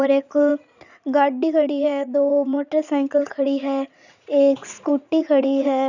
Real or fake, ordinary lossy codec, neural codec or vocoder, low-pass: fake; none; vocoder, 44.1 kHz, 80 mel bands, Vocos; 7.2 kHz